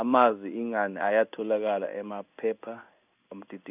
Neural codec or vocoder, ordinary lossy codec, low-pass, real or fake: codec, 16 kHz in and 24 kHz out, 1 kbps, XY-Tokenizer; none; 3.6 kHz; fake